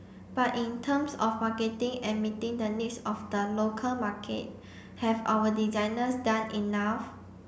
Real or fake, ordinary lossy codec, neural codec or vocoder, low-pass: real; none; none; none